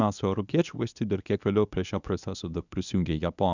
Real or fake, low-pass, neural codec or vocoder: fake; 7.2 kHz; codec, 24 kHz, 0.9 kbps, WavTokenizer, medium speech release version 1